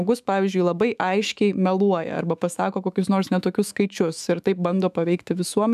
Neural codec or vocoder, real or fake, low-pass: autoencoder, 48 kHz, 128 numbers a frame, DAC-VAE, trained on Japanese speech; fake; 14.4 kHz